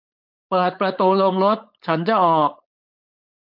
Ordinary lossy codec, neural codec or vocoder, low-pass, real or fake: none; codec, 16 kHz, 4.8 kbps, FACodec; 5.4 kHz; fake